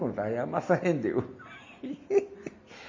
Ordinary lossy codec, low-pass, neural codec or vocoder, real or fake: MP3, 32 kbps; 7.2 kHz; none; real